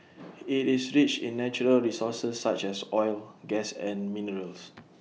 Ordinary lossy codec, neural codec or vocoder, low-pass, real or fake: none; none; none; real